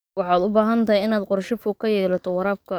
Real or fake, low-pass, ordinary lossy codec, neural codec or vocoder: fake; none; none; codec, 44.1 kHz, 7.8 kbps, DAC